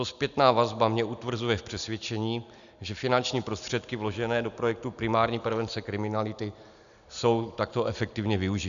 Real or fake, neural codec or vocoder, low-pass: real; none; 7.2 kHz